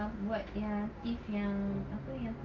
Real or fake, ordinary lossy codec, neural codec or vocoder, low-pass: real; Opus, 24 kbps; none; 7.2 kHz